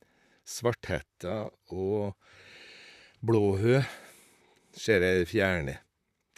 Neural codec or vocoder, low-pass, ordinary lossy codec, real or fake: vocoder, 44.1 kHz, 128 mel bands, Pupu-Vocoder; 14.4 kHz; none; fake